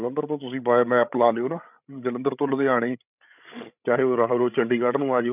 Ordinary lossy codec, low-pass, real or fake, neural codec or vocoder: none; 3.6 kHz; fake; codec, 16 kHz, 16 kbps, FreqCodec, larger model